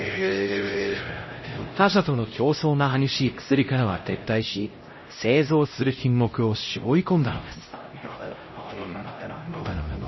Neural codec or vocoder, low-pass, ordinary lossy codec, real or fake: codec, 16 kHz, 0.5 kbps, X-Codec, HuBERT features, trained on LibriSpeech; 7.2 kHz; MP3, 24 kbps; fake